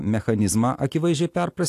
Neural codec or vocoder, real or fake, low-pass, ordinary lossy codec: none; real; 14.4 kHz; AAC, 64 kbps